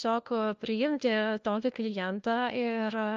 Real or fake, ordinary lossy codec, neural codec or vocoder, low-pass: fake; Opus, 24 kbps; codec, 16 kHz, 1 kbps, FunCodec, trained on LibriTTS, 50 frames a second; 7.2 kHz